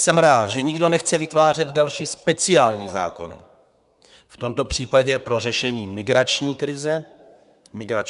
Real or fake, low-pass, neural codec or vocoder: fake; 10.8 kHz; codec, 24 kHz, 1 kbps, SNAC